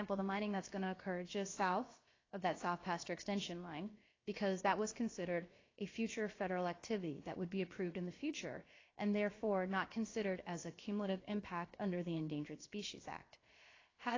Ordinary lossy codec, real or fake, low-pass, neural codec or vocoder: AAC, 32 kbps; fake; 7.2 kHz; codec, 16 kHz, about 1 kbps, DyCAST, with the encoder's durations